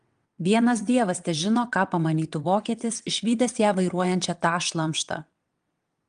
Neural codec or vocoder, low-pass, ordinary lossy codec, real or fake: vocoder, 22.05 kHz, 80 mel bands, WaveNeXt; 9.9 kHz; Opus, 32 kbps; fake